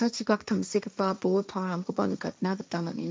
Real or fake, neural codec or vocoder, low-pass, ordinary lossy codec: fake; codec, 16 kHz, 1.1 kbps, Voila-Tokenizer; none; none